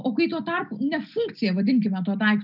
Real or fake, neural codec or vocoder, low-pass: real; none; 5.4 kHz